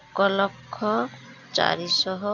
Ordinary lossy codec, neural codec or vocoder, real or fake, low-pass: none; none; real; 7.2 kHz